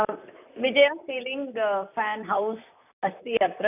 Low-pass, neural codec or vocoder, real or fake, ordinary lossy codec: 3.6 kHz; vocoder, 44.1 kHz, 128 mel bands, Pupu-Vocoder; fake; none